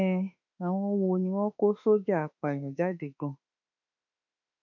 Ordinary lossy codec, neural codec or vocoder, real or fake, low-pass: none; autoencoder, 48 kHz, 32 numbers a frame, DAC-VAE, trained on Japanese speech; fake; 7.2 kHz